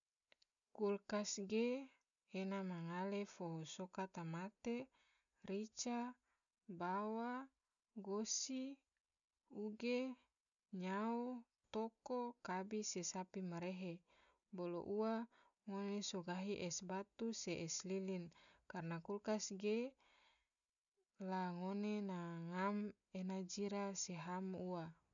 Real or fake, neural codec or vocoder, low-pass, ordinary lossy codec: real; none; 7.2 kHz; none